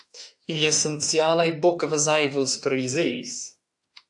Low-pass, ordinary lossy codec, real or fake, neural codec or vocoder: 10.8 kHz; AAC, 64 kbps; fake; autoencoder, 48 kHz, 32 numbers a frame, DAC-VAE, trained on Japanese speech